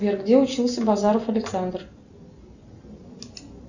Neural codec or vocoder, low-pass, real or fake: none; 7.2 kHz; real